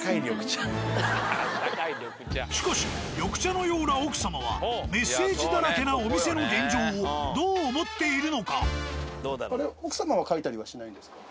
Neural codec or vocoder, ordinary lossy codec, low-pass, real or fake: none; none; none; real